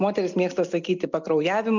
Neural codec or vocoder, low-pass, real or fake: none; 7.2 kHz; real